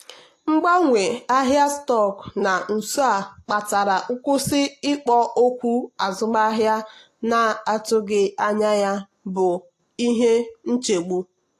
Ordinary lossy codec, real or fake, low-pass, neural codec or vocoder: AAC, 48 kbps; real; 14.4 kHz; none